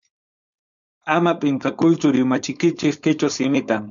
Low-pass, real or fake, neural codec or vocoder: 7.2 kHz; fake; codec, 16 kHz, 4.8 kbps, FACodec